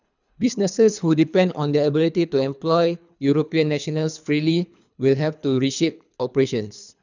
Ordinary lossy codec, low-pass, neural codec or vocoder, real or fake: none; 7.2 kHz; codec, 24 kHz, 3 kbps, HILCodec; fake